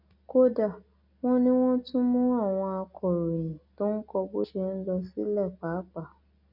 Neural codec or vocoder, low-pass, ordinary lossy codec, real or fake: none; 5.4 kHz; none; real